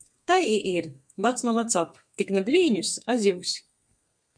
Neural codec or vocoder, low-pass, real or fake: codec, 32 kHz, 1.9 kbps, SNAC; 9.9 kHz; fake